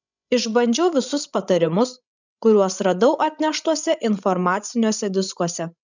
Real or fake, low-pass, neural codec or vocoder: fake; 7.2 kHz; codec, 16 kHz, 16 kbps, FreqCodec, larger model